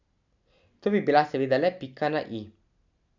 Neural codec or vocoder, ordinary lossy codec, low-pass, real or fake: none; none; 7.2 kHz; real